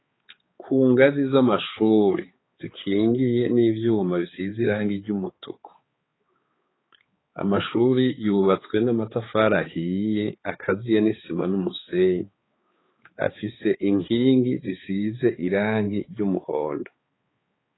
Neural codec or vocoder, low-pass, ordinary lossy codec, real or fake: codec, 16 kHz, 4 kbps, X-Codec, HuBERT features, trained on balanced general audio; 7.2 kHz; AAC, 16 kbps; fake